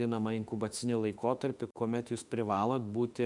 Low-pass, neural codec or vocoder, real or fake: 10.8 kHz; autoencoder, 48 kHz, 32 numbers a frame, DAC-VAE, trained on Japanese speech; fake